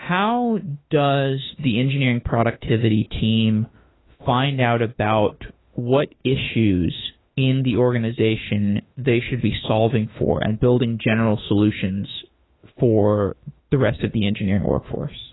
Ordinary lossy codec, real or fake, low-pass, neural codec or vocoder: AAC, 16 kbps; fake; 7.2 kHz; autoencoder, 48 kHz, 32 numbers a frame, DAC-VAE, trained on Japanese speech